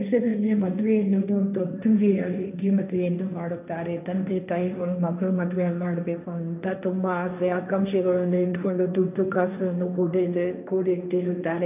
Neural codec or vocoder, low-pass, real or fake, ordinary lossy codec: codec, 16 kHz, 1.1 kbps, Voila-Tokenizer; 3.6 kHz; fake; none